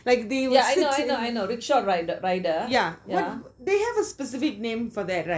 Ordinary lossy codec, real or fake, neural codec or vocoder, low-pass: none; real; none; none